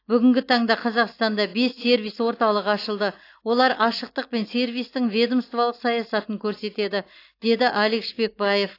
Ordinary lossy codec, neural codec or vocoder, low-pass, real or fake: AAC, 32 kbps; none; 5.4 kHz; real